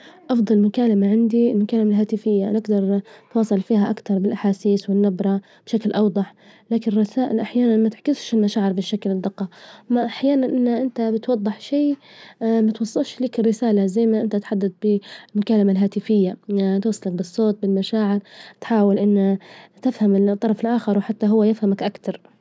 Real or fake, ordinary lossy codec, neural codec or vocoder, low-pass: real; none; none; none